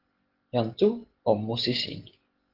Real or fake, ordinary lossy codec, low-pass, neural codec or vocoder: real; Opus, 16 kbps; 5.4 kHz; none